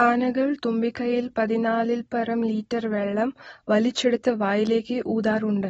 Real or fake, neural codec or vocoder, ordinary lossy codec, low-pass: real; none; AAC, 24 kbps; 19.8 kHz